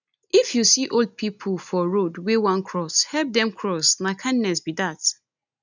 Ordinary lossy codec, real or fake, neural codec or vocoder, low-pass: none; real; none; 7.2 kHz